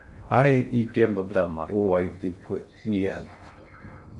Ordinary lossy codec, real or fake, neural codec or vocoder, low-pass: MP3, 96 kbps; fake; codec, 16 kHz in and 24 kHz out, 0.6 kbps, FocalCodec, streaming, 2048 codes; 10.8 kHz